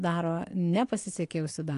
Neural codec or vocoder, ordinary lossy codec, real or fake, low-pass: none; AAC, 48 kbps; real; 10.8 kHz